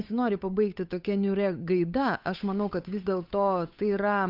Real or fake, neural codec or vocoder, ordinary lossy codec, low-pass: fake; codec, 16 kHz, 8 kbps, FunCodec, trained on Chinese and English, 25 frames a second; Opus, 64 kbps; 5.4 kHz